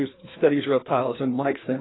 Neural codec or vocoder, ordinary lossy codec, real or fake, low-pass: codec, 16 kHz, 2 kbps, FreqCodec, larger model; AAC, 16 kbps; fake; 7.2 kHz